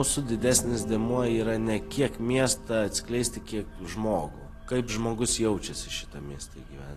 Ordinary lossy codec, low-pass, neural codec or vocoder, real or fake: AAC, 48 kbps; 14.4 kHz; none; real